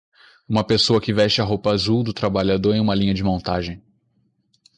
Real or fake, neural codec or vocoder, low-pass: real; none; 10.8 kHz